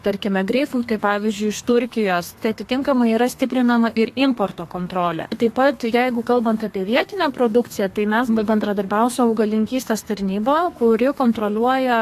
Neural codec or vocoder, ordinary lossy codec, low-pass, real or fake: codec, 32 kHz, 1.9 kbps, SNAC; AAC, 64 kbps; 14.4 kHz; fake